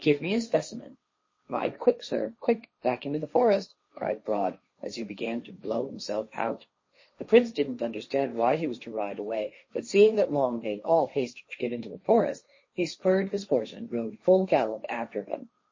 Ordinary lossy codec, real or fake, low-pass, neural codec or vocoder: MP3, 32 kbps; fake; 7.2 kHz; codec, 16 kHz, 1.1 kbps, Voila-Tokenizer